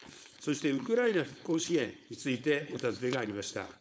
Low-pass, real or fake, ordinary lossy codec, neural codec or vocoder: none; fake; none; codec, 16 kHz, 4.8 kbps, FACodec